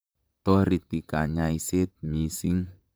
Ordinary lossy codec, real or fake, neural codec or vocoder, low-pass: none; fake; vocoder, 44.1 kHz, 128 mel bands, Pupu-Vocoder; none